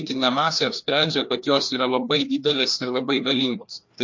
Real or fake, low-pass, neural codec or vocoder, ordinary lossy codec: fake; 7.2 kHz; codec, 16 kHz, 2 kbps, FreqCodec, larger model; MP3, 48 kbps